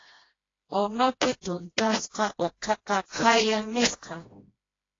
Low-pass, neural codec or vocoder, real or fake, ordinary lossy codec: 7.2 kHz; codec, 16 kHz, 1 kbps, FreqCodec, smaller model; fake; AAC, 32 kbps